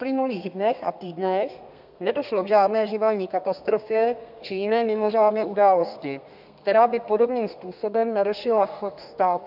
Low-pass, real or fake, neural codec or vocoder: 5.4 kHz; fake; codec, 32 kHz, 1.9 kbps, SNAC